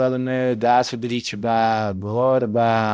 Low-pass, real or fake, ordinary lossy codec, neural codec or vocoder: none; fake; none; codec, 16 kHz, 0.5 kbps, X-Codec, HuBERT features, trained on balanced general audio